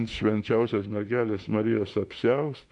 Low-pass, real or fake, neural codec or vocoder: 10.8 kHz; fake; autoencoder, 48 kHz, 32 numbers a frame, DAC-VAE, trained on Japanese speech